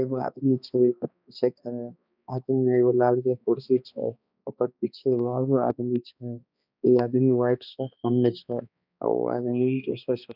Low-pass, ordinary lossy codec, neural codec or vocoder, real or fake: 5.4 kHz; none; codec, 16 kHz, 2 kbps, X-Codec, HuBERT features, trained on balanced general audio; fake